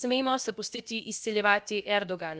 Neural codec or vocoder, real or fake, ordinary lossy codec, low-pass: codec, 16 kHz, about 1 kbps, DyCAST, with the encoder's durations; fake; none; none